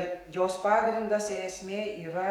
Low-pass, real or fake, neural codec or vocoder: 19.8 kHz; fake; codec, 44.1 kHz, 7.8 kbps, DAC